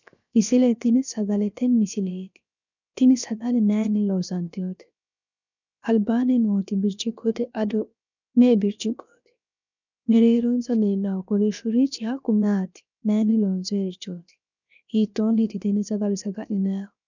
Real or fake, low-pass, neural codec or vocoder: fake; 7.2 kHz; codec, 16 kHz, 0.7 kbps, FocalCodec